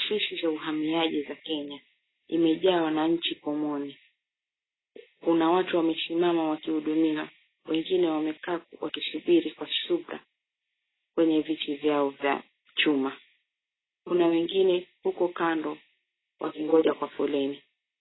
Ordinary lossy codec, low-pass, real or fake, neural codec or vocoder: AAC, 16 kbps; 7.2 kHz; real; none